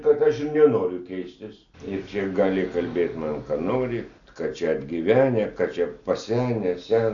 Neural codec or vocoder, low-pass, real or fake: none; 7.2 kHz; real